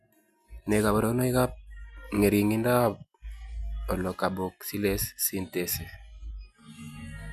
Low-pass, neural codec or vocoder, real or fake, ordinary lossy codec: 14.4 kHz; none; real; none